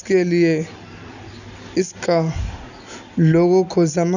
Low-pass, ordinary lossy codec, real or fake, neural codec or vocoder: 7.2 kHz; none; real; none